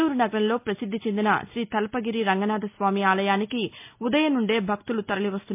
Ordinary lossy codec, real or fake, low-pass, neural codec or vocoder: MP3, 32 kbps; real; 3.6 kHz; none